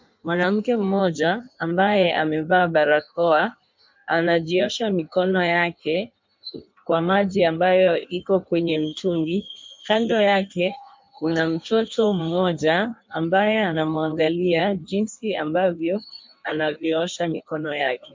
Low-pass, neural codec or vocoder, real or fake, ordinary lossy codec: 7.2 kHz; codec, 16 kHz in and 24 kHz out, 1.1 kbps, FireRedTTS-2 codec; fake; MP3, 64 kbps